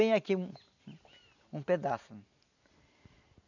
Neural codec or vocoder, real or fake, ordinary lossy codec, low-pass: none; real; none; 7.2 kHz